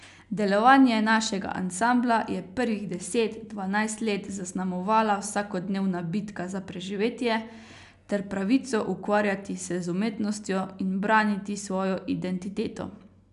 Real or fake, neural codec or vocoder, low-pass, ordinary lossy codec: real; none; 10.8 kHz; none